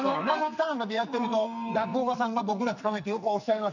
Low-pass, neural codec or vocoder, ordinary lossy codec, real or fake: 7.2 kHz; codec, 44.1 kHz, 2.6 kbps, SNAC; none; fake